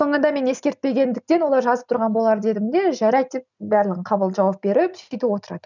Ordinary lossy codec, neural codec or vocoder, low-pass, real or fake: none; none; 7.2 kHz; real